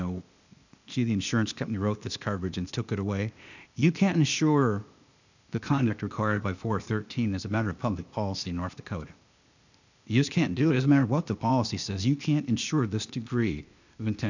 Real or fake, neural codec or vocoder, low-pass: fake; codec, 16 kHz, 0.8 kbps, ZipCodec; 7.2 kHz